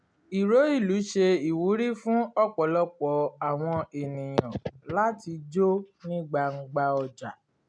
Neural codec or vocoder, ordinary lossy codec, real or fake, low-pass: none; MP3, 96 kbps; real; 9.9 kHz